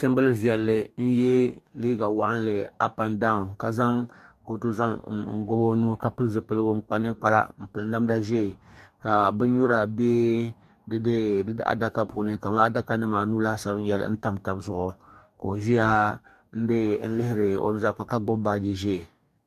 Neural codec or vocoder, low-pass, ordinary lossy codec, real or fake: codec, 44.1 kHz, 2.6 kbps, DAC; 14.4 kHz; MP3, 96 kbps; fake